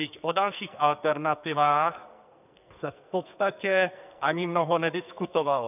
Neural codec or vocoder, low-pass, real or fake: codec, 44.1 kHz, 2.6 kbps, SNAC; 3.6 kHz; fake